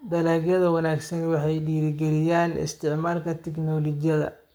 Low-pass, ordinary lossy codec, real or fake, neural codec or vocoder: none; none; fake; codec, 44.1 kHz, 7.8 kbps, Pupu-Codec